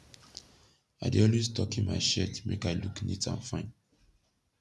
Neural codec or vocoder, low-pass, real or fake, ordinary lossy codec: none; none; real; none